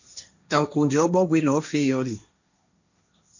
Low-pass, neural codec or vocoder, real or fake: 7.2 kHz; codec, 16 kHz, 1.1 kbps, Voila-Tokenizer; fake